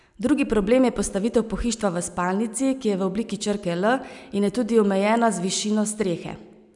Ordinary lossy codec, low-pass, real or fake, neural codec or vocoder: none; 10.8 kHz; real; none